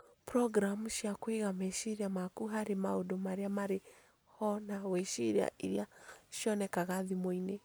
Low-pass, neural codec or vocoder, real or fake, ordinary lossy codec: none; none; real; none